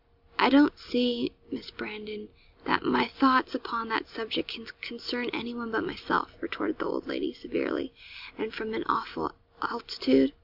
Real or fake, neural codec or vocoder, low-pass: real; none; 5.4 kHz